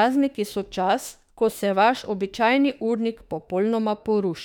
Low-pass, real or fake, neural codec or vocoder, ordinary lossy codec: 19.8 kHz; fake; autoencoder, 48 kHz, 32 numbers a frame, DAC-VAE, trained on Japanese speech; none